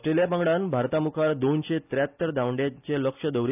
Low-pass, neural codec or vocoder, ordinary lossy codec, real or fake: 3.6 kHz; none; none; real